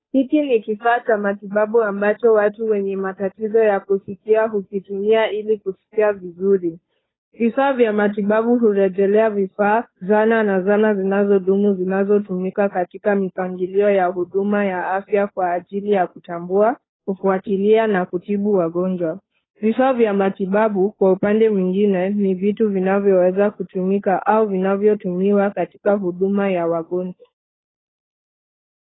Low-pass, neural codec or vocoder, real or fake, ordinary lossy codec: 7.2 kHz; codec, 16 kHz, 2 kbps, FunCodec, trained on Chinese and English, 25 frames a second; fake; AAC, 16 kbps